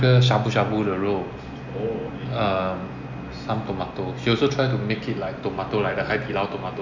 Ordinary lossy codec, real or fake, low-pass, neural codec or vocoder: none; real; 7.2 kHz; none